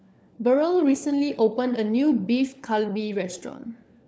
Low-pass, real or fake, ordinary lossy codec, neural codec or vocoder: none; fake; none; codec, 16 kHz, 4 kbps, FunCodec, trained on LibriTTS, 50 frames a second